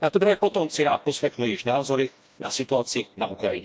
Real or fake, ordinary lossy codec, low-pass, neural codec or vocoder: fake; none; none; codec, 16 kHz, 1 kbps, FreqCodec, smaller model